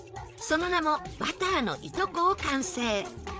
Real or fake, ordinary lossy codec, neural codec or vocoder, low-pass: fake; none; codec, 16 kHz, 8 kbps, FreqCodec, larger model; none